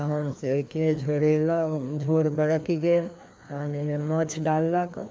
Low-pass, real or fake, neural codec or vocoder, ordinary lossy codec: none; fake; codec, 16 kHz, 2 kbps, FreqCodec, larger model; none